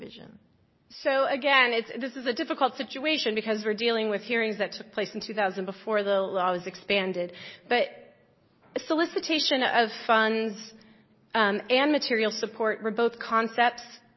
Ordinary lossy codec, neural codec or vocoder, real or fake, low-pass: MP3, 24 kbps; none; real; 7.2 kHz